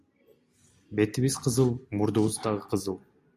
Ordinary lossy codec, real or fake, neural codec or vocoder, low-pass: MP3, 96 kbps; real; none; 14.4 kHz